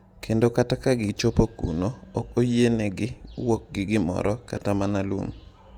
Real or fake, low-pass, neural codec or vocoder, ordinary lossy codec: fake; 19.8 kHz; vocoder, 48 kHz, 128 mel bands, Vocos; Opus, 64 kbps